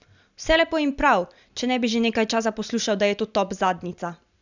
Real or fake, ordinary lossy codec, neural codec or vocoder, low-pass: real; none; none; 7.2 kHz